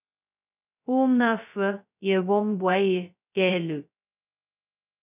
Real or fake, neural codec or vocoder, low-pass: fake; codec, 16 kHz, 0.2 kbps, FocalCodec; 3.6 kHz